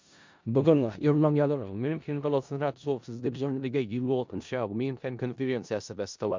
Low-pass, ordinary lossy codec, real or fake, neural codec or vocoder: 7.2 kHz; MP3, 64 kbps; fake; codec, 16 kHz in and 24 kHz out, 0.4 kbps, LongCat-Audio-Codec, four codebook decoder